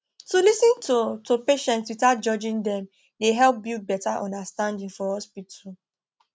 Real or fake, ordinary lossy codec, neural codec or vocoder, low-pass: real; none; none; none